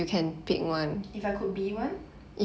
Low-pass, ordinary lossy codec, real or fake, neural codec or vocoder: none; none; real; none